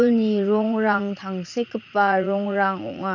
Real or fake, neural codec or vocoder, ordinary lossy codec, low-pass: fake; vocoder, 44.1 kHz, 80 mel bands, Vocos; none; 7.2 kHz